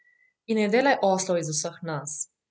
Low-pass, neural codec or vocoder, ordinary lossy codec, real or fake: none; none; none; real